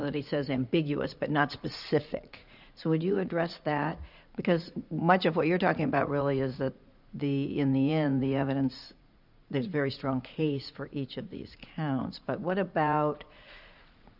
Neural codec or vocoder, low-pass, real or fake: vocoder, 44.1 kHz, 80 mel bands, Vocos; 5.4 kHz; fake